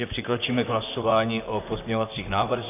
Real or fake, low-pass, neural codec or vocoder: fake; 3.6 kHz; vocoder, 44.1 kHz, 128 mel bands, Pupu-Vocoder